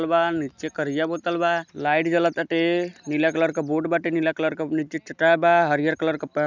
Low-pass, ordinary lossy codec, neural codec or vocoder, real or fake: 7.2 kHz; none; none; real